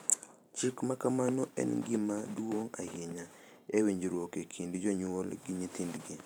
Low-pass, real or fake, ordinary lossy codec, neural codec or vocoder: none; fake; none; vocoder, 44.1 kHz, 128 mel bands every 512 samples, BigVGAN v2